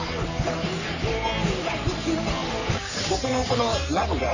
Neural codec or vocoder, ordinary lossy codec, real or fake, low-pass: codec, 44.1 kHz, 3.4 kbps, Pupu-Codec; none; fake; 7.2 kHz